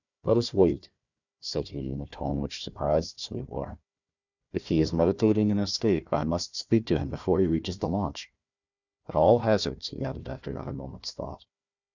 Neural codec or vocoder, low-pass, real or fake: codec, 16 kHz, 1 kbps, FunCodec, trained on Chinese and English, 50 frames a second; 7.2 kHz; fake